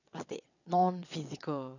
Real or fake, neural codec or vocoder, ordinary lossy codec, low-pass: fake; vocoder, 22.05 kHz, 80 mel bands, WaveNeXt; none; 7.2 kHz